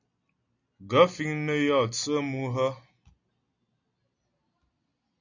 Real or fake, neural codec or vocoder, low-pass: real; none; 7.2 kHz